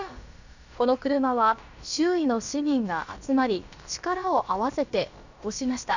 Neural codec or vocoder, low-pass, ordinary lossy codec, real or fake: codec, 16 kHz, about 1 kbps, DyCAST, with the encoder's durations; 7.2 kHz; none; fake